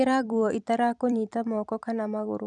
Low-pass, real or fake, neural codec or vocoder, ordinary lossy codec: 10.8 kHz; fake; vocoder, 44.1 kHz, 128 mel bands every 256 samples, BigVGAN v2; none